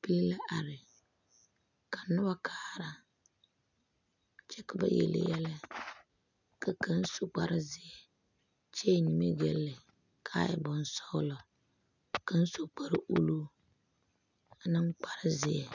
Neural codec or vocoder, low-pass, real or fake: none; 7.2 kHz; real